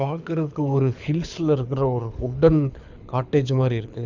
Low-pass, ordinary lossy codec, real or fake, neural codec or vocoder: 7.2 kHz; Opus, 64 kbps; fake; codec, 24 kHz, 6 kbps, HILCodec